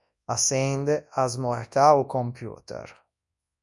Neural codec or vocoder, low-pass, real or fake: codec, 24 kHz, 0.9 kbps, WavTokenizer, large speech release; 10.8 kHz; fake